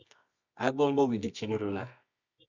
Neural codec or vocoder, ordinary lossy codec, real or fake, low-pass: codec, 24 kHz, 0.9 kbps, WavTokenizer, medium music audio release; Opus, 64 kbps; fake; 7.2 kHz